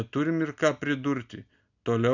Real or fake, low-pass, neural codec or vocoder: real; 7.2 kHz; none